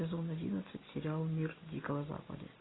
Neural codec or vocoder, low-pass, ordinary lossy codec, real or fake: none; 7.2 kHz; AAC, 16 kbps; real